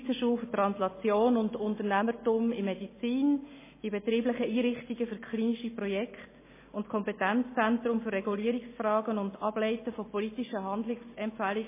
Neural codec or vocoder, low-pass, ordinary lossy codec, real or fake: none; 3.6 kHz; MP3, 16 kbps; real